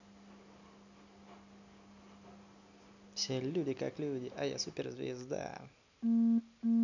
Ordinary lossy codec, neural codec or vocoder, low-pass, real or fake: none; none; 7.2 kHz; real